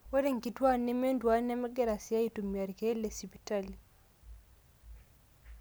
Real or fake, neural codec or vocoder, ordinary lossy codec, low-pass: real; none; none; none